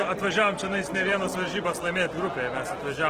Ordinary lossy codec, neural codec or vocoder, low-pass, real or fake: Opus, 16 kbps; none; 14.4 kHz; real